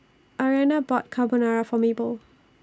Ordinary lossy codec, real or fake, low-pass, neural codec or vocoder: none; real; none; none